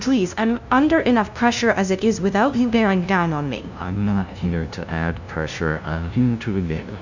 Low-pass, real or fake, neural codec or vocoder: 7.2 kHz; fake; codec, 16 kHz, 0.5 kbps, FunCodec, trained on LibriTTS, 25 frames a second